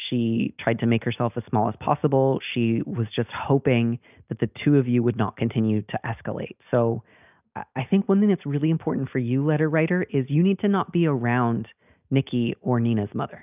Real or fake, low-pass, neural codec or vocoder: real; 3.6 kHz; none